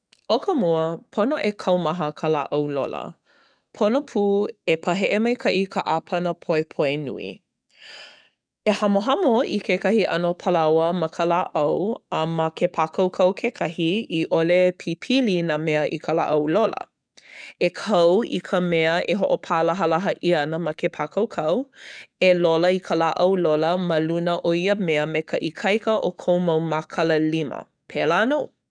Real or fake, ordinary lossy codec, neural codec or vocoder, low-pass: fake; none; codec, 44.1 kHz, 7.8 kbps, DAC; 9.9 kHz